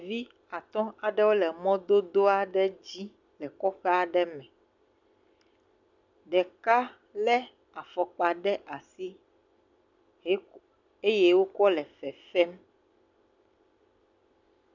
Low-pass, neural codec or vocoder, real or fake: 7.2 kHz; none; real